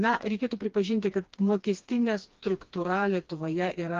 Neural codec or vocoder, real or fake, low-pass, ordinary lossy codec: codec, 16 kHz, 2 kbps, FreqCodec, smaller model; fake; 7.2 kHz; Opus, 32 kbps